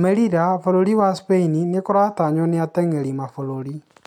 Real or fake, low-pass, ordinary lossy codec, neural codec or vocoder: real; 19.8 kHz; none; none